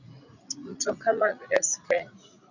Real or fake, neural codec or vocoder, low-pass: fake; vocoder, 44.1 kHz, 80 mel bands, Vocos; 7.2 kHz